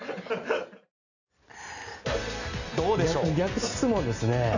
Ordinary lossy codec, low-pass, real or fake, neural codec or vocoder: none; 7.2 kHz; real; none